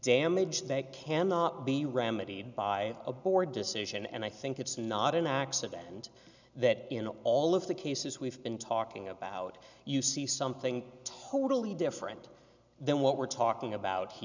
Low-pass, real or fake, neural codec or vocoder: 7.2 kHz; real; none